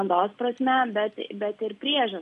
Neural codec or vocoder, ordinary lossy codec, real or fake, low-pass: none; AAC, 64 kbps; real; 10.8 kHz